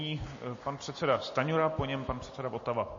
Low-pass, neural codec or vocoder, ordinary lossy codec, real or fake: 7.2 kHz; none; MP3, 32 kbps; real